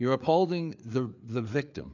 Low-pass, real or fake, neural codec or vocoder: 7.2 kHz; fake; codec, 16 kHz, 4 kbps, FreqCodec, larger model